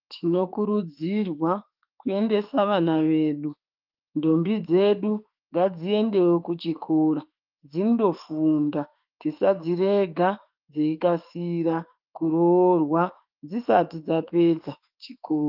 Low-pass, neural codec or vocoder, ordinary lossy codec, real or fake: 5.4 kHz; autoencoder, 48 kHz, 32 numbers a frame, DAC-VAE, trained on Japanese speech; Opus, 32 kbps; fake